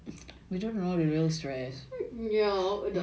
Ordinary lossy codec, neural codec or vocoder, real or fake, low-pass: none; none; real; none